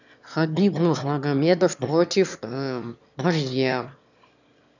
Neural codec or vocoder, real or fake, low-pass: autoencoder, 22.05 kHz, a latent of 192 numbers a frame, VITS, trained on one speaker; fake; 7.2 kHz